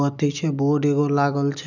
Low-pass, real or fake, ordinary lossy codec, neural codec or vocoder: 7.2 kHz; real; none; none